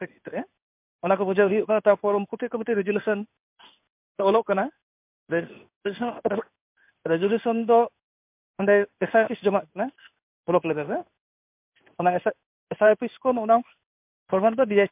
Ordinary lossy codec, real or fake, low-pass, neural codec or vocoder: MP3, 32 kbps; fake; 3.6 kHz; codec, 16 kHz in and 24 kHz out, 1 kbps, XY-Tokenizer